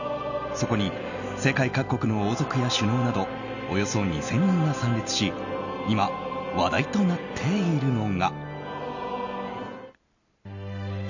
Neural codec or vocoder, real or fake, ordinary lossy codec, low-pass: none; real; none; 7.2 kHz